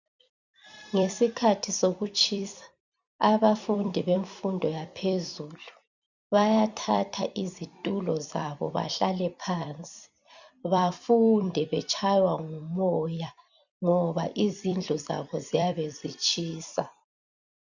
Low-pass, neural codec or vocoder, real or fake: 7.2 kHz; vocoder, 44.1 kHz, 128 mel bands every 256 samples, BigVGAN v2; fake